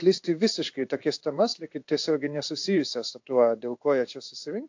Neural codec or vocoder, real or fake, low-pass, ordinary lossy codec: codec, 16 kHz in and 24 kHz out, 1 kbps, XY-Tokenizer; fake; 7.2 kHz; AAC, 48 kbps